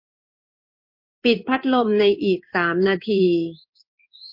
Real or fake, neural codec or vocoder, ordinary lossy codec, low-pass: fake; codec, 16 kHz in and 24 kHz out, 1 kbps, XY-Tokenizer; MP3, 32 kbps; 5.4 kHz